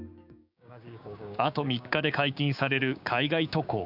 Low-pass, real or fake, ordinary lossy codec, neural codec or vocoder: 5.4 kHz; fake; none; codec, 16 kHz, 6 kbps, DAC